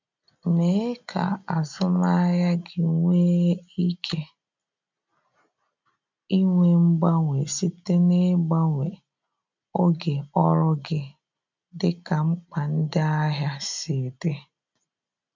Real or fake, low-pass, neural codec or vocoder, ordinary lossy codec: real; 7.2 kHz; none; MP3, 64 kbps